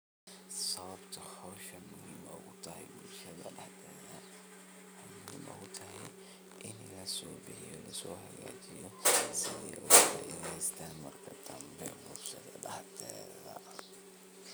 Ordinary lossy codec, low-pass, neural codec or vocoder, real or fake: none; none; none; real